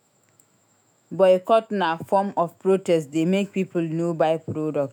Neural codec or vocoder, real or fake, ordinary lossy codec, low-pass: autoencoder, 48 kHz, 128 numbers a frame, DAC-VAE, trained on Japanese speech; fake; none; 19.8 kHz